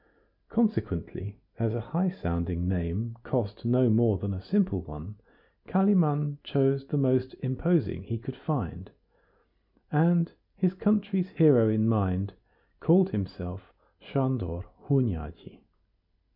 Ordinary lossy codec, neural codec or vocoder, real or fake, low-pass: MP3, 48 kbps; none; real; 5.4 kHz